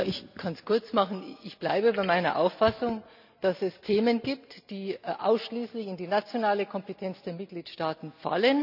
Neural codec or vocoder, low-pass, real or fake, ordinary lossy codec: none; 5.4 kHz; real; none